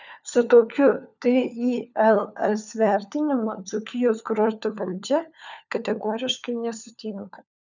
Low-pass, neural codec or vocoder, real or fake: 7.2 kHz; codec, 16 kHz, 4 kbps, FunCodec, trained on LibriTTS, 50 frames a second; fake